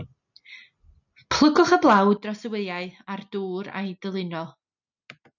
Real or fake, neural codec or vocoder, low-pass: real; none; 7.2 kHz